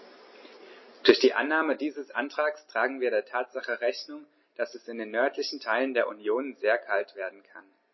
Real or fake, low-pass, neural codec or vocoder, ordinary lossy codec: real; 7.2 kHz; none; MP3, 24 kbps